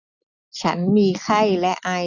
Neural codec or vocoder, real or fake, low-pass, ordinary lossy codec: none; real; 7.2 kHz; none